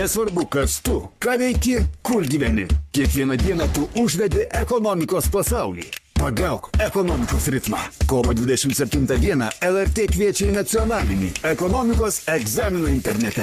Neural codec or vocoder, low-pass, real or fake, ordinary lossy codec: codec, 44.1 kHz, 3.4 kbps, Pupu-Codec; 14.4 kHz; fake; MP3, 96 kbps